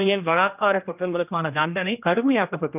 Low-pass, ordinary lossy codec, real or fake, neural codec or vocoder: 3.6 kHz; none; fake; codec, 16 kHz, 1 kbps, X-Codec, HuBERT features, trained on general audio